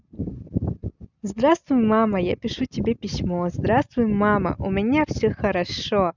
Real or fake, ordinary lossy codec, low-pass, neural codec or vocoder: real; none; 7.2 kHz; none